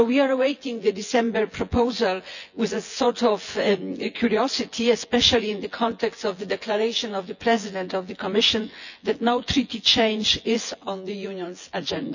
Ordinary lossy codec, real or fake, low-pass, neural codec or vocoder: none; fake; 7.2 kHz; vocoder, 24 kHz, 100 mel bands, Vocos